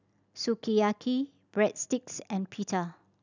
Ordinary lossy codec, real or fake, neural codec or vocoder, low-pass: none; real; none; 7.2 kHz